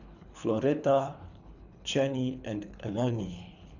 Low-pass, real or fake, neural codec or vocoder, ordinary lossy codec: 7.2 kHz; fake; codec, 24 kHz, 3 kbps, HILCodec; none